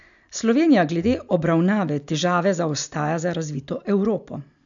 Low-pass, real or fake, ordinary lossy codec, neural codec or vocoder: 7.2 kHz; real; none; none